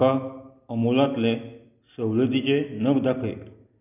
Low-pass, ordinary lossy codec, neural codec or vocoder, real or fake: 3.6 kHz; none; none; real